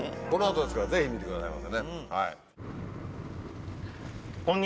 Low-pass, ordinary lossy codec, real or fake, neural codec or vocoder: none; none; real; none